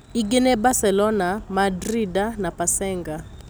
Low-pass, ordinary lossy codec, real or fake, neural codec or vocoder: none; none; real; none